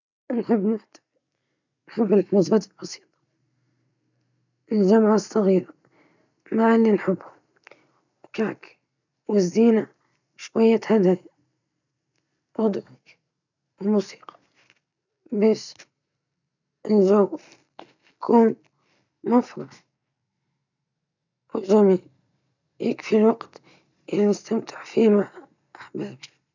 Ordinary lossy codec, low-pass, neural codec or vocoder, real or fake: none; 7.2 kHz; none; real